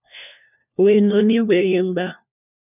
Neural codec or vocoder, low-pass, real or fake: codec, 16 kHz, 1 kbps, FunCodec, trained on LibriTTS, 50 frames a second; 3.6 kHz; fake